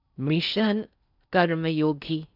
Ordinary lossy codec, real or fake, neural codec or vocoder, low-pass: none; fake; codec, 16 kHz in and 24 kHz out, 0.6 kbps, FocalCodec, streaming, 4096 codes; 5.4 kHz